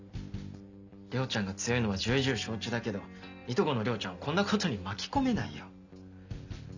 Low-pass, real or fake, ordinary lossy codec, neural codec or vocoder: 7.2 kHz; real; none; none